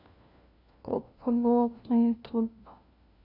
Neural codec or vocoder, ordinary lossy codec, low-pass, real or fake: codec, 16 kHz, 1 kbps, FunCodec, trained on LibriTTS, 50 frames a second; none; 5.4 kHz; fake